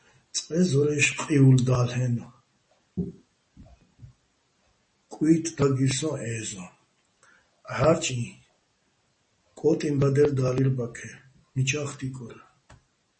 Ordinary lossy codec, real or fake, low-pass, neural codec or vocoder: MP3, 32 kbps; real; 10.8 kHz; none